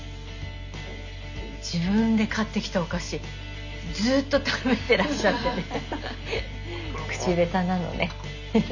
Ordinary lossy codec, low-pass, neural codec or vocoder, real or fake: none; 7.2 kHz; none; real